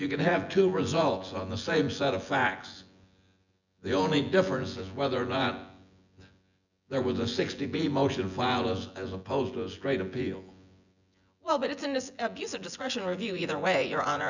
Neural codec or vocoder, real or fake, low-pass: vocoder, 24 kHz, 100 mel bands, Vocos; fake; 7.2 kHz